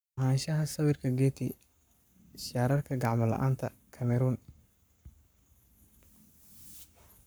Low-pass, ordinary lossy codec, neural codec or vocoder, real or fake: none; none; codec, 44.1 kHz, 7.8 kbps, Pupu-Codec; fake